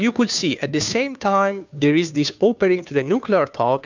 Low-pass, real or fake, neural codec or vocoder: 7.2 kHz; fake; codec, 16 kHz, 2 kbps, FunCodec, trained on Chinese and English, 25 frames a second